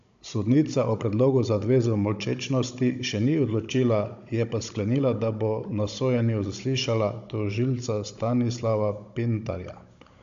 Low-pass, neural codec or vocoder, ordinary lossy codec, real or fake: 7.2 kHz; codec, 16 kHz, 16 kbps, FunCodec, trained on Chinese and English, 50 frames a second; AAC, 64 kbps; fake